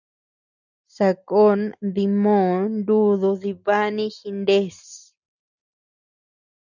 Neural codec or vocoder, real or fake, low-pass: none; real; 7.2 kHz